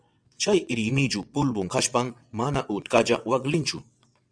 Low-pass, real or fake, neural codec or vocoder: 9.9 kHz; fake; vocoder, 22.05 kHz, 80 mel bands, WaveNeXt